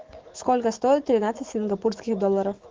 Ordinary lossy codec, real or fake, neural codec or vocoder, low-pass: Opus, 16 kbps; fake; autoencoder, 48 kHz, 128 numbers a frame, DAC-VAE, trained on Japanese speech; 7.2 kHz